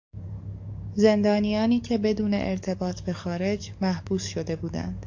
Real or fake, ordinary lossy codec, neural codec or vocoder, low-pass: fake; AAC, 48 kbps; codec, 44.1 kHz, 7.8 kbps, DAC; 7.2 kHz